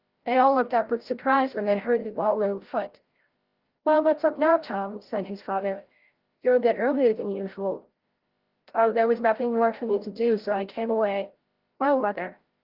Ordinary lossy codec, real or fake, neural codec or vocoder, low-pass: Opus, 16 kbps; fake; codec, 16 kHz, 0.5 kbps, FreqCodec, larger model; 5.4 kHz